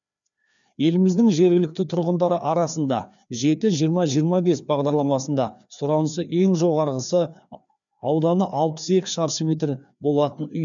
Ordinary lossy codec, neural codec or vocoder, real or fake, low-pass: none; codec, 16 kHz, 2 kbps, FreqCodec, larger model; fake; 7.2 kHz